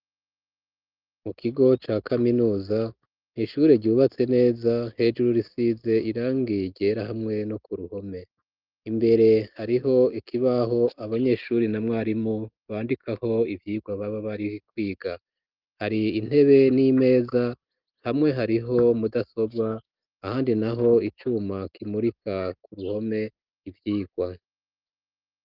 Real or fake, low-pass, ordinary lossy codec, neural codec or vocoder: real; 5.4 kHz; Opus, 32 kbps; none